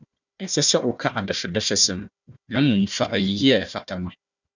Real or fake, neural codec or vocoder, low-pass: fake; codec, 16 kHz, 1 kbps, FunCodec, trained on Chinese and English, 50 frames a second; 7.2 kHz